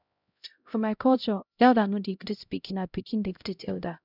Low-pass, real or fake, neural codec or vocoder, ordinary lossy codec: 5.4 kHz; fake; codec, 16 kHz, 0.5 kbps, X-Codec, HuBERT features, trained on LibriSpeech; none